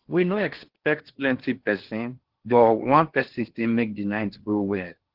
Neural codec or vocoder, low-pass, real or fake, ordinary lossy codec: codec, 16 kHz in and 24 kHz out, 0.8 kbps, FocalCodec, streaming, 65536 codes; 5.4 kHz; fake; Opus, 16 kbps